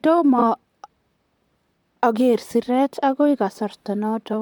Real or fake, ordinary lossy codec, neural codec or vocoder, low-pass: fake; MP3, 96 kbps; vocoder, 44.1 kHz, 128 mel bands, Pupu-Vocoder; 19.8 kHz